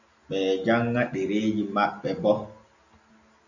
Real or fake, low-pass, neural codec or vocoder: real; 7.2 kHz; none